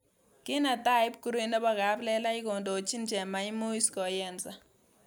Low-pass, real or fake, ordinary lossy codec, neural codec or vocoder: none; real; none; none